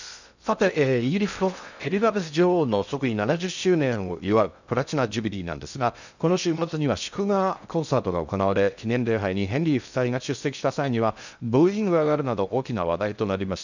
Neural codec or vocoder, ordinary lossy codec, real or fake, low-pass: codec, 16 kHz in and 24 kHz out, 0.6 kbps, FocalCodec, streaming, 2048 codes; none; fake; 7.2 kHz